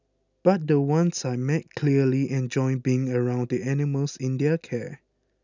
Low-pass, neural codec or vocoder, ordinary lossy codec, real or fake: 7.2 kHz; none; none; real